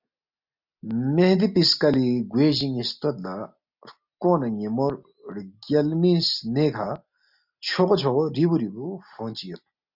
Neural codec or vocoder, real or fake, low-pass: none; real; 5.4 kHz